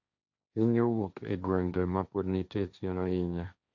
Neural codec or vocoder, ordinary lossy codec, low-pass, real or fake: codec, 16 kHz, 1.1 kbps, Voila-Tokenizer; none; 7.2 kHz; fake